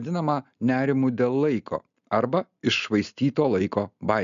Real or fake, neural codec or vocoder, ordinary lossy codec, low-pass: real; none; MP3, 96 kbps; 7.2 kHz